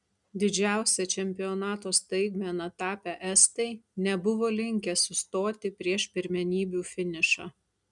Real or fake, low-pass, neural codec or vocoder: real; 10.8 kHz; none